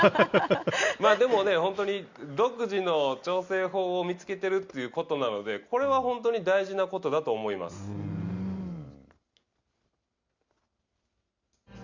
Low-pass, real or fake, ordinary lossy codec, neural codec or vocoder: 7.2 kHz; real; Opus, 64 kbps; none